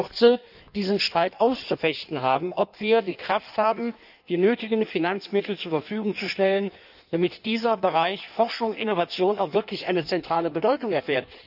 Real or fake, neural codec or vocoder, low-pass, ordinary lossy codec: fake; codec, 16 kHz in and 24 kHz out, 1.1 kbps, FireRedTTS-2 codec; 5.4 kHz; none